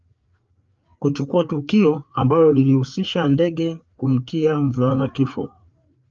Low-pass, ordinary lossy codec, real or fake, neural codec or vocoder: 7.2 kHz; Opus, 24 kbps; fake; codec, 16 kHz, 4 kbps, FreqCodec, larger model